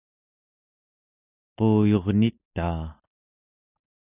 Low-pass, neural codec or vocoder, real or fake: 3.6 kHz; none; real